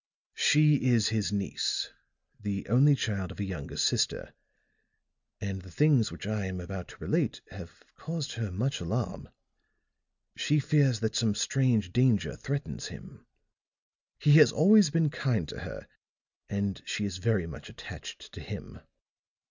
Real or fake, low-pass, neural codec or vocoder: real; 7.2 kHz; none